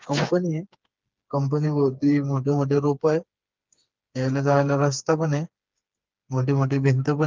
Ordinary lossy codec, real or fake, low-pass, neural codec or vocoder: Opus, 32 kbps; fake; 7.2 kHz; codec, 16 kHz, 4 kbps, FreqCodec, smaller model